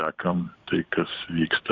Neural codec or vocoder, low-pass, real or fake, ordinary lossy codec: vocoder, 44.1 kHz, 128 mel bands every 512 samples, BigVGAN v2; 7.2 kHz; fake; Opus, 64 kbps